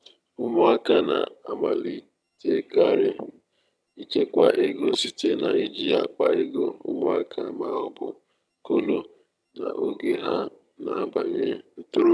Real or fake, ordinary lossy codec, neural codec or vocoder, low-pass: fake; none; vocoder, 22.05 kHz, 80 mel bands, HiFi-GAN; none